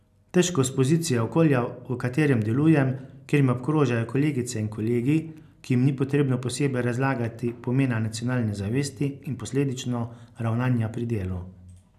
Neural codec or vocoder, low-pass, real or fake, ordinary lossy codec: none; 14.4 kHz; real; AAC, 96 kbps